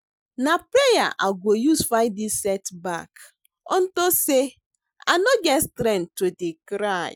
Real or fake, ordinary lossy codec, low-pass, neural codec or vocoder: real; none; none; none